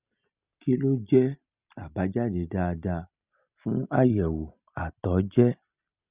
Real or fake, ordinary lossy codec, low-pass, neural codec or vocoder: real; Opus, 64 kbps; 3.6 kHz; none